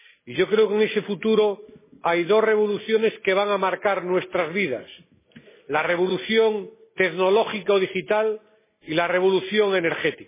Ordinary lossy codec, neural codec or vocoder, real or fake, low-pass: MP3, 16 kbps; none; real; 3.6 kHz